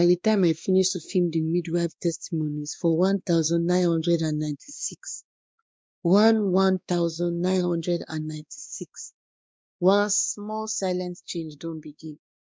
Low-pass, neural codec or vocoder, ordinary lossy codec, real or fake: none; codec, 16 kHz, 2 kbps, X-Codec, WavLM features, trained on Multilingual LibriSpeech; none; fake